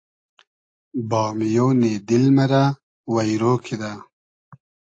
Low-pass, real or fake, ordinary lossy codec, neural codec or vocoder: 9.9 kHz; real; AAC, 48 kbps; none